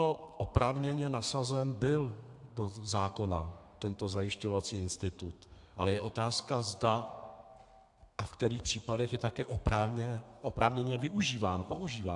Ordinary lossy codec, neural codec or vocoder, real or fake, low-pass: AAC, 64 kbps; codec, 32 kHz, 1.9 kbps, SNAC; fake; 10.8 kHz